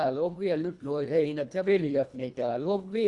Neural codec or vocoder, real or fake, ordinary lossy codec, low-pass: codec, 24 kHz, 1.5 kbps, HILCodec; fake; Opus, 64 kbps; 10.8 kHz